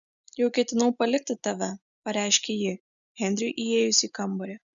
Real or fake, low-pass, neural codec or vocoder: real; 7.2 kHz; none